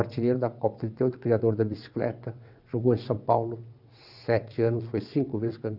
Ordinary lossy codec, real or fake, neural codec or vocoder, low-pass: none; fake; vocoder, 22.05 kHz, 80 mel bands, WaveNeXt; 5.4 kHz